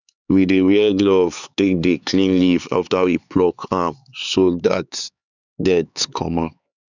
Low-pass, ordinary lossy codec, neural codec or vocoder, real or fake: 7.2 kHz; none; codec, 16 kHz, 4 kbps, X-Codec, HuBERT features, trained on LibriSpeech; fake